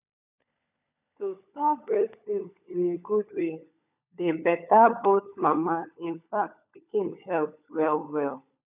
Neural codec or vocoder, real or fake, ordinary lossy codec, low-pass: codec, 16 kHz, 16 kbps, FunCodec, trained on LibriTTS, 50 frames a second; fake; none; 3.6 kHz